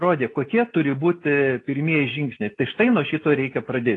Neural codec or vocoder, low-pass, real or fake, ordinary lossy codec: none; 10.8 kHz; real; AAC, 32 kbps